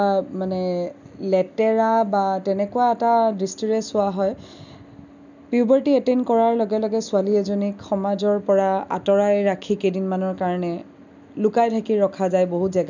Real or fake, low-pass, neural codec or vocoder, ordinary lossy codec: real; 7.2 kHz; none; none